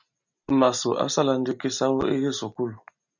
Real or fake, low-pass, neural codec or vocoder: real; 7.2 kHz; none